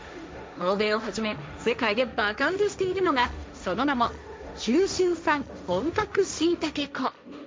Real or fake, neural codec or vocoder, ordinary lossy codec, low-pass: fake; codec, 16 kHz, 1.1 kbps, Voila-Tokenizer; none; none